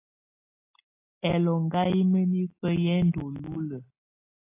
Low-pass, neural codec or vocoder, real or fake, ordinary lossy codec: 3.6 kHz; none; real; AAC, 24 kbps